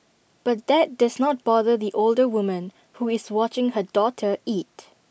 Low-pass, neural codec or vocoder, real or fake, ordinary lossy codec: none; none; real; none